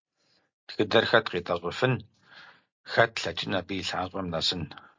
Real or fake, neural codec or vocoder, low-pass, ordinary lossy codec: real; none; 7.2 kHz; MP3, 48 kbps